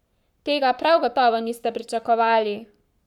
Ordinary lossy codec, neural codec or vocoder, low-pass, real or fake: none; codec, 44.1 kHz, 7.8 kbps, Pupu-Codec; 19.8 kHz; fake